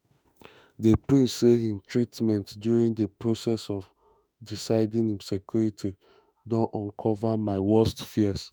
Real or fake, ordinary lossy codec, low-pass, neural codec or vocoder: fake; none; none; autoencoder, 48 kHz, 32 numbers a frame, DAC-VAE, trained on Japanese speech